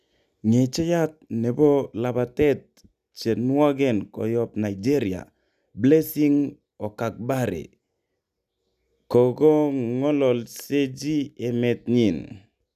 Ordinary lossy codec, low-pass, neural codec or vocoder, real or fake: none; 14.4 kHz; none; real